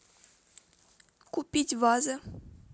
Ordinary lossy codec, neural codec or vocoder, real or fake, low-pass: none; none; real; none